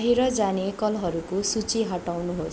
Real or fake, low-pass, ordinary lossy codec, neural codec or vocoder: real; none; none; none